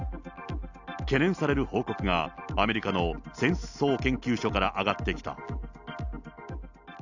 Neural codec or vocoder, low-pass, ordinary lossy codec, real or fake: none; 7.2 kHz; none; real